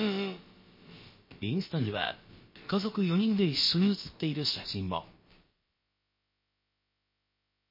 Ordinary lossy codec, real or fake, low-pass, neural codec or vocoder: MP3, 24 kbps; fake; 5.4 kHz; codec, 16 kHz, about 1 kbps, DyCAST, with the encoder's durations